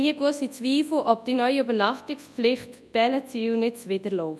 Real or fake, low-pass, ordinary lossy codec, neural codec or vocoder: fake; none; none; codec, 24 kHz, 0.9 kbps, WavTokenizer, large speech release